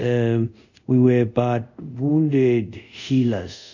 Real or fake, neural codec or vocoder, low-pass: fake; codec, 24 kHz, 0.5 kbps, DualCodec; 7.2 kHz